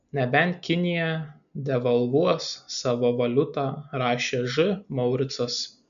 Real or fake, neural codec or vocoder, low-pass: real; none; 7.2 kHz